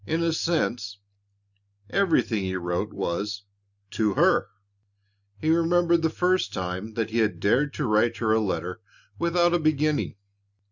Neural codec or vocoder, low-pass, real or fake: none; 7.2 kHz; real